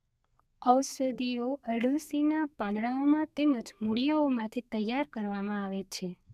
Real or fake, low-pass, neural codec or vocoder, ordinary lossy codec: fake; 14.4 kHz; codec, 32 kHz, 1.9 kbps, SNAC; none